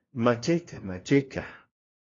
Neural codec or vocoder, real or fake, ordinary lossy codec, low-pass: codec, 16 kHz, 1 kbps, FunCodec, trained on LibriTTS, 50 frames a second; fake; AAC, 32 kbps; 7.2 kHz